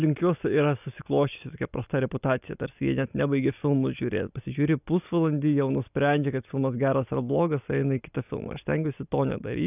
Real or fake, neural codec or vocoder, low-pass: real; none; 3.6 kHz